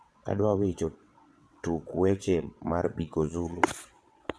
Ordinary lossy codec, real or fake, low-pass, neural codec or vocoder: none; fake; none; vocoder, 22.05 kHz, 80 mel bands, WaveNeXt